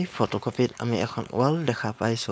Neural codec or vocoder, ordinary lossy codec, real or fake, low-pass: codec, 16 kHz, 4.8 kbps, FACodec; none; fake; none